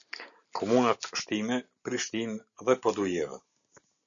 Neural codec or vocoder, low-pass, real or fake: none; 7.2 kHz; real